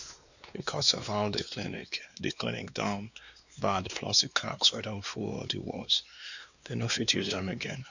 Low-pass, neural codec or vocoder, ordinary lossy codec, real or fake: 7.2 kHz; codec, 16 kHz, 2 kbps, X-Codec, WavLM features, trained on Multilingual LibriSpeech; none; fake